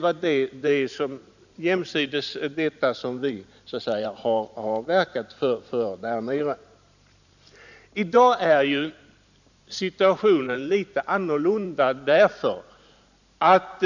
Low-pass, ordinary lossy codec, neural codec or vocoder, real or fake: 7.2 kHz; none; vocoder, 44.1 kHz, 128 mel bands every 512 samples, BigVGAN v2; fake